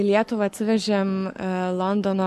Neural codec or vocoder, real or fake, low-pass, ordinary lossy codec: codec, 44.1 kHz, 7.8 kbps, Pupu-Codec; fake; 14.4 kHz; MP3, 64 kbps